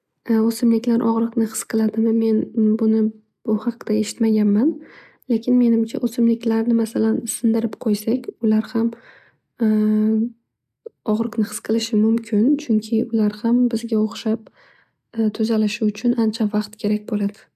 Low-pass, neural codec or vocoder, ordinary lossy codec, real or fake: 14.4 kHz; none; AAC, 96 kbps; real